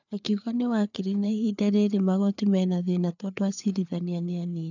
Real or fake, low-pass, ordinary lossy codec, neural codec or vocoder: fake; 7.2 kHz; none; codec, 16 kHz, 4 kbps, FreqCodec, larger model